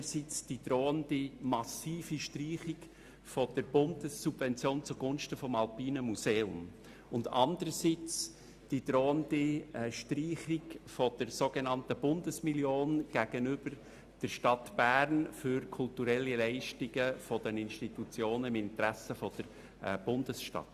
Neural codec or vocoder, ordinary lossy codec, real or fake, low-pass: none; Opus, 64 kbps; real; 14.4 kHz